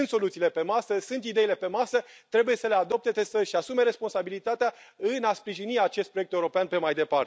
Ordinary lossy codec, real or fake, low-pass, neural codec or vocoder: none; real; none; none